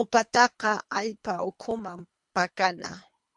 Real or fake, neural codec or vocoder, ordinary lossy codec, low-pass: fake; codec, 24 kHz, 3 kbps, HILCodec; MP3, 64 kbps; 10.8 kHz